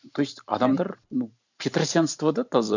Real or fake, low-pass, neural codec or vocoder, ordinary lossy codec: fake; 7.2 kHz; vocoder, 44.1 kHz, 128 mel bands every 512 samples, BigVGAN v2; none